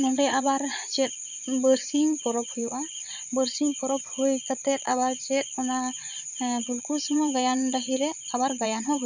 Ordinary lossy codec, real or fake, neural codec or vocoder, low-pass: none; fake; vocoder, 22.05 kHz, 80 mel bands, Vocos; 7.2 kHz